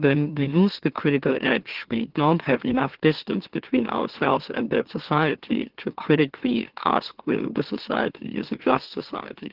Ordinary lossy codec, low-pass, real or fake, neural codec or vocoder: Opus, 16 kbps; 5.4 kHz; fake; autoencoder, 44.1 kHz, a latent of 192 numbers a frame, MeloTTS